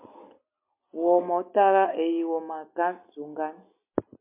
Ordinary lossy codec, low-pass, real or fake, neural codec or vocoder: MP3, 32 kbps; 3.6 kHz; real; none